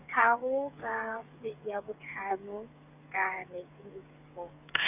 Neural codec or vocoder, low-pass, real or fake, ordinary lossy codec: codec, 16 kHz, 8 kbps, FreqCodec, smaller model; 3.6 kHz; fake; none